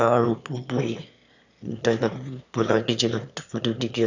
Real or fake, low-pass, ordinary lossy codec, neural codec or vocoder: fake; 7.2 kHz; none; autoencoder, 22.05 kHz, a latent of 192 numbers a frame, VITS, trained on one speaker